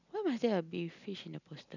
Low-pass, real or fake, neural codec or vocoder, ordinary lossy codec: 7.2 kHz; real; none; none